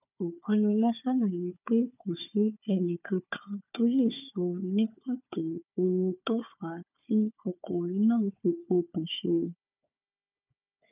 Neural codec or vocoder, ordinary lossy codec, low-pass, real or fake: codec, 16 kHz, 4 kbps, FunCodec, trained on Chinese and English, 50 frames a second; none; 3.6 kHz; fake